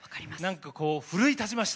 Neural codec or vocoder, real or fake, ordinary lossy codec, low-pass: none; real; none; none